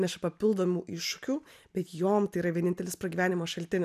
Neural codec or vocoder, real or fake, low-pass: none; real; 14.4 kHz